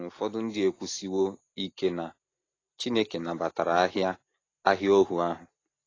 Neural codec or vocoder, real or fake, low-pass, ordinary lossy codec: none; real; 7.2 kHz; AAC, 32 kbps